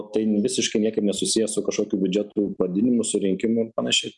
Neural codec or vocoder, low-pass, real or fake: none; 10.8 kHz; real